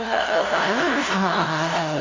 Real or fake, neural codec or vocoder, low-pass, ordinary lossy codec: fake; codec, 16 kHz, 0.5 kbps, FunCodec, trained on LibriTTS, 25 frames a second; 7.2 kHz; AAC, 32 kbps